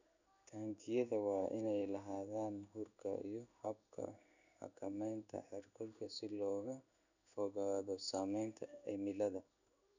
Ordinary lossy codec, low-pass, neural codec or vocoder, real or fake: none; 7.2 kHz; codec, 16 kHz in and 24 kHz out, 1 kbps, XY-Tokenizer; fake